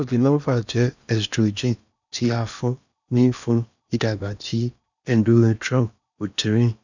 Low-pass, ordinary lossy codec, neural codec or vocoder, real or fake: 7.2 kHz; none; codec, 16 kHz in and 24 kHz out, 0.8 kbps, FocalCodec, streaming, 65536 codes; fake